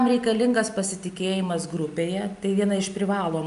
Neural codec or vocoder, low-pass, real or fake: none; 10.8 kHz; real